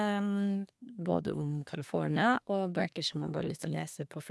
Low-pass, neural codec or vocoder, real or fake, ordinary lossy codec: none; codec, 24 kHz, 1 kbps, SNAC; fake; none